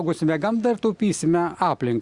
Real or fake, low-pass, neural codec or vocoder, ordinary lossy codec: real; 10.8 kHz; none; Opus, 64 kbps